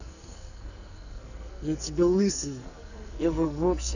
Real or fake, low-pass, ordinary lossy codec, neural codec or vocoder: fake; 7.2 kHz; none; codec, 44.1 kHz, 2.6 kbps, SNAC